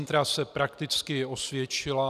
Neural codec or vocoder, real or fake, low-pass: none; real; 14.4 kHz